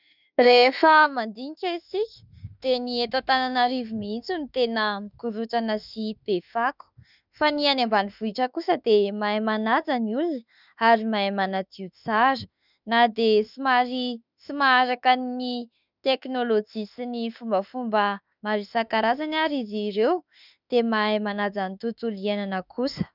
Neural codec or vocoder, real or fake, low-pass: autoencoder, 48 kHz, 32 numbers a frame, DAC-VAE, trained on Japanese speech; fake; 5.4 kHz